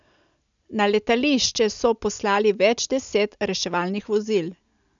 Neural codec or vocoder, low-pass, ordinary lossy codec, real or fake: none; 7.2 kHz; none; real